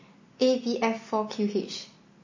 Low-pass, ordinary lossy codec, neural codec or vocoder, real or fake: 7.2 kHz; MP3, 32 kbps; none; real